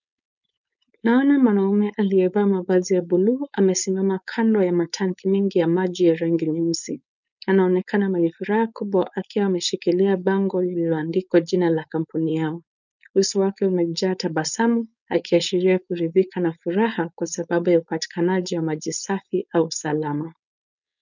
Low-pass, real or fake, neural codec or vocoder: 7.2 kHz; fake; codec, 16 kHz, 4.8 kbps, FACodec